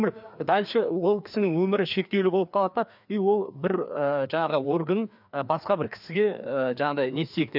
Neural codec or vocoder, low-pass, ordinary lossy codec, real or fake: codec, 16 kHz, 2 kbps, FreqCodec, larger model; 5.4 kHz; none; fake